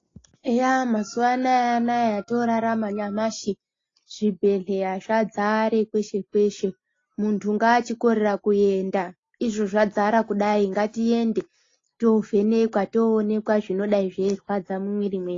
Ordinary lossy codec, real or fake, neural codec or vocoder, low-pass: AAC, 32 kbps; real; none; 7.2 kHz